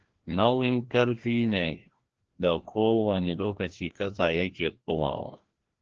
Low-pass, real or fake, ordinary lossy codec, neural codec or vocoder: 7.2 kHz; fake; Opus, 16 kbps; codec, 16 kHz, 1 kbps, FreqCodec, larger model